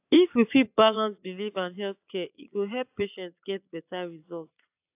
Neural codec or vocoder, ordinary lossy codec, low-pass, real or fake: vocoder, 22.05 kHz, 80 mel bands, Vocos; AAC, 32 kbps; 3.6 kHz; fake